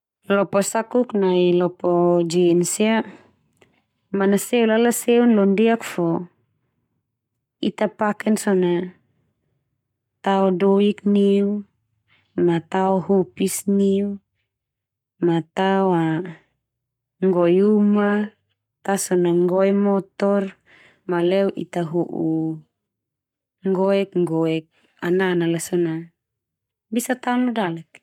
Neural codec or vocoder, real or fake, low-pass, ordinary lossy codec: codec, 44.1 kHz, 7.8 kbps, Pupu-Codec; fake; 19.8 kHz; none